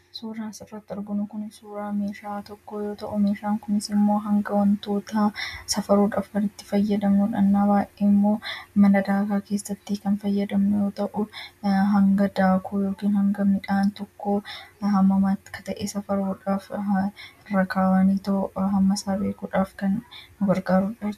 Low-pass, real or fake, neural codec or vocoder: 14.4 kHz; real; none